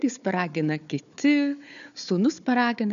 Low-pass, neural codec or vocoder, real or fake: 7.2 kHz; codec, 16 kHz, 4 kbps, FunCodec, trained on Chinese and English, 50 frames a second; fake